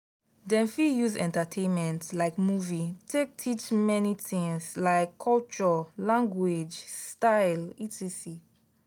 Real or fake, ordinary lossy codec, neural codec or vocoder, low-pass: real; none; none; none